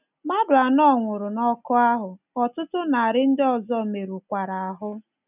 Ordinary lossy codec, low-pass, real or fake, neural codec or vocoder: none; 3.6 kHz; real; none